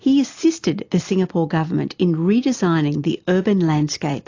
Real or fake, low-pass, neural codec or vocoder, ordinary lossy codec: real; 7.2 kHz; none; AAC, 48 kbps